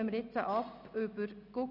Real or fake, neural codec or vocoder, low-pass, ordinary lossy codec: real; none; 5.4 kHz; none